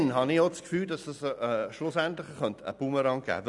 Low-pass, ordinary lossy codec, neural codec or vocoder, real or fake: 10.8 kHz; none; none; real